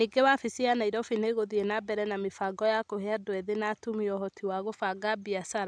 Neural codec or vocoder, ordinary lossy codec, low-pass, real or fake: none; none; none; real